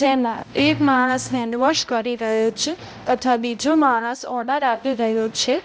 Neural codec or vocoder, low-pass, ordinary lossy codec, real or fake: codec, 16 kHz, 0.5 kbps, X-Codec, HuBERT features, trained on balanced general audio; none; none; fake